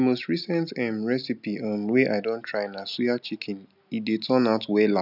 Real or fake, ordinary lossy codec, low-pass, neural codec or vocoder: real; none; 5.4 kHz; none